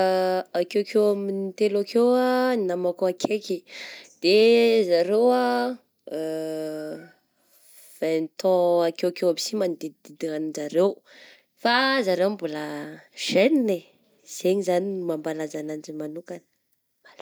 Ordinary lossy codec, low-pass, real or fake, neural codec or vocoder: none; none; real; none